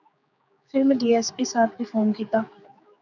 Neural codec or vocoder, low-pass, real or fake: codec, 16 kHz, 4 kbps, X-Codec, HuBERT features, trained on general audio; 7.2 kHz; fake